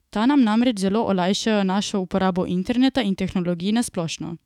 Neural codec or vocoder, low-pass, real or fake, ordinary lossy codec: autoencoder, 48 kHz, 128 numbers a frame, DAC-VAE, trained on Japanese speech; 19.8 kHz; fake; none